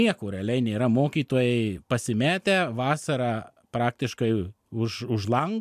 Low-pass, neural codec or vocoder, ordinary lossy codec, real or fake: 14.4 kHz; none; MP3, 96 kbps; real